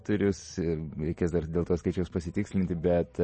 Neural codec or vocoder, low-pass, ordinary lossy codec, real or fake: none; 10.8 kHz; MP3, 32 kbps; real